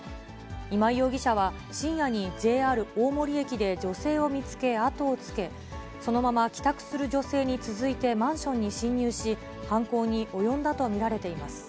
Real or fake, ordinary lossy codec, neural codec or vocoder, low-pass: real; none; none; none